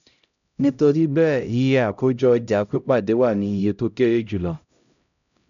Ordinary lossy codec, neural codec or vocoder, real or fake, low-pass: MP3, 96 kbps; codec, 16 kHz, 0.5 kbps, X-Codec, HuBERT features, trained on LibriSpeech; fake; 7.2 kHz